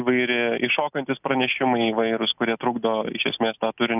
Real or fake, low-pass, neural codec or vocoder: real; 3.6 kHz; none